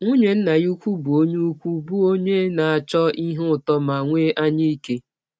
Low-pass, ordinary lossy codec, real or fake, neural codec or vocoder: none; none; real; none